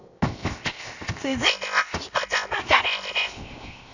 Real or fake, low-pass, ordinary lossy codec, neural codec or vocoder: fake; 7.2 kHz; none; codec, 16 kHz, 0.7 kbps, FocalCodec